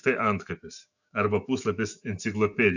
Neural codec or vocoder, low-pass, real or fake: autoencoder, 48 kHz, 128 numbers a frame, DAC-VAE, trained on Japanese speech; 7.2 kHz; fake